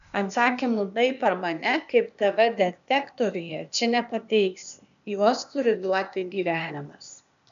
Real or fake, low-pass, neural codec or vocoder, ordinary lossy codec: fake; 7.2 kHz; codec, 16 kHz, 0.8 kbps, ZipCodec; AAC, 96 kbps